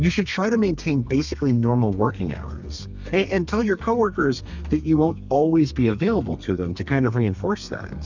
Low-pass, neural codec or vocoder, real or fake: 7.2 kHz; codec, 32 kHz, 1.9 kbps, SNAC; fake